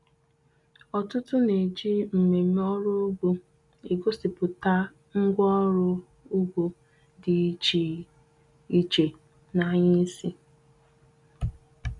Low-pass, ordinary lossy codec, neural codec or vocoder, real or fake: 10.8 kHz; none; none; real